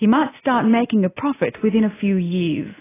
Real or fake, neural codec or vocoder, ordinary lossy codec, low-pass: real; none; AAC, 16 kbps; 3.6 kHz